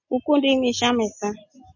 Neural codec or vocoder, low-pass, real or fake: none; 7.2 kHz; real